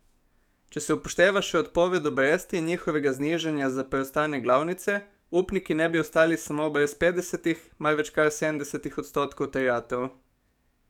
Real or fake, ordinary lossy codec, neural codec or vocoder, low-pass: fake; none; codec, 44.1 kHz, 7.8 kbps, DAC; 19.8 kHz